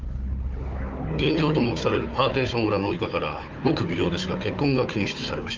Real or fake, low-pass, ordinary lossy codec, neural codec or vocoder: fake; 7.2 kHz; Opus, 24 kbps; codec, 16 kHz, 4 kbps, FunCodec, trained on Chinese and English, 50 frames a second